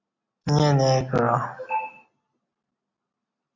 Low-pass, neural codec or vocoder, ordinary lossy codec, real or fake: 7.2 kHz; none; MP3, 48 kbps; real